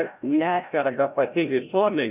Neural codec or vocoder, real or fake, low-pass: codec, 16 kHz, 0.5 kbps, FreqCodec, larger model; fake; 3.6 kHz